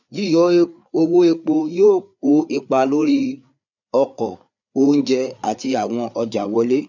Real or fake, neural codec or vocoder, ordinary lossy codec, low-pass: fake; codec, 16 kHz, 4 kbps, FreqCodec, larger model; none; 7.2 kHz